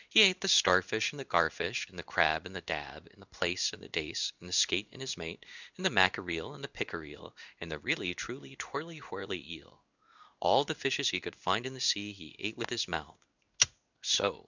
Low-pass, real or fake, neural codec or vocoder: 7.2 kHz; fake; codec, 16 kHz in and 24 kHz out, 1 kbps, XY-Tokenizer